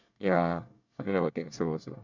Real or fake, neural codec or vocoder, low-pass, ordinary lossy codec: fake; codec, 24 kHz, 1 kbps, SNAC; 7.2 kHz; none